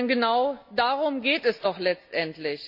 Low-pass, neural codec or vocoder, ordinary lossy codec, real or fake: 5.4 kHz; none; none; real